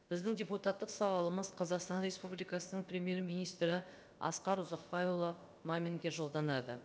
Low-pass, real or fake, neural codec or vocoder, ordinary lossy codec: none; fake; codec, 16 kHz, about 1 kbps, DyCAST, with the encoder's durations; none